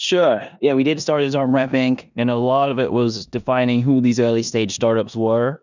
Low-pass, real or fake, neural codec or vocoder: 7.2 kHz; fake; codec, 16 kHz in and 24 kHz out, 0.9 kbps, LongCat-Audio-Codec, four codebook decoder